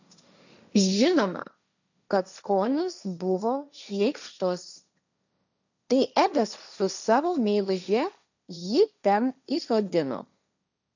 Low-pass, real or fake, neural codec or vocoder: 7.2 kHz; fake; codec, 16 kHz, 1.1 kbps, Voila-Tokenizer